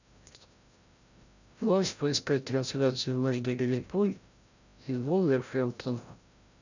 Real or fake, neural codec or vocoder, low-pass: fake; codec, 16 kHz, 0.5 kbps, FreqCodec, larger model; 7.2 kHz